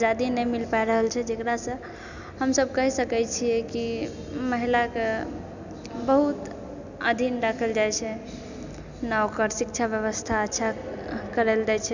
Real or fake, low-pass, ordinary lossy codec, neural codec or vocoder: real; 7.2 kHz; none; none